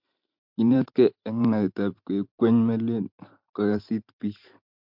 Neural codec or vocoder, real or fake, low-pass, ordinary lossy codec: vocoder, 44.1 kHz, 128 mel bands every 512 samples, BigVGAN v2; fake; 5.4 kHz; MP3, 48 kbps